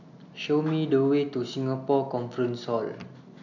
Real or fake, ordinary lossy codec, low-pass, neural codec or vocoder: real; none; 7.2 kHz; none